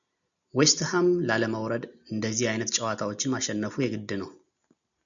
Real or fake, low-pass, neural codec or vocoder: real; 7.2 kHz; none